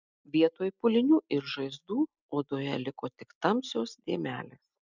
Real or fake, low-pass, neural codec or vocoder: real; 7.2 kHz; none